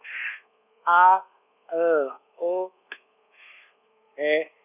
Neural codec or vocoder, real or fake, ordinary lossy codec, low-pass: codec, 24 kHz, 1.2 kbps, DualCodec; fake; none; 3.6 kHz